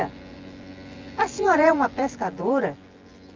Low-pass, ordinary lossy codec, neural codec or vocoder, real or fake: 7.2 kHz; Opus, 32 kbps; vocoder, 24 kHz, 100 mel bands, Vocos; fake